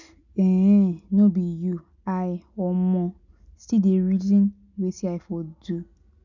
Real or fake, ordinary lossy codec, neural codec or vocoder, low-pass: real; none; none; 7.2 kHz